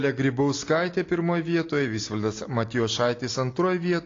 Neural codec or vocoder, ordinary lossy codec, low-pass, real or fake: none; AAC, 32 kbps; 7.2 kHz; real